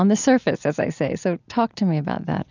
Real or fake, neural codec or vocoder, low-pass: real; none; 7.2 kHz